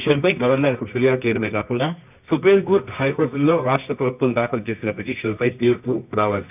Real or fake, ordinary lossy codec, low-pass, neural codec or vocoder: fake; none; 3.6 kHz; codec, 24 kHz, 0.9 kbps, WavTokenizer, medium music audio release